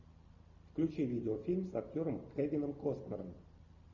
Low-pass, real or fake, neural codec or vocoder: 7.2 kHz; real; none